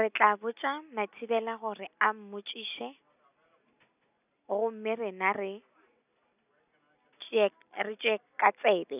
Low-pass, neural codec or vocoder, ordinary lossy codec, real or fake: 3.6 kHz; none; none; real